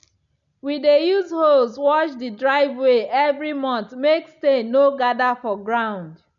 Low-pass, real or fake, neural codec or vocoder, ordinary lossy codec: 7.2 kHz; real; none; none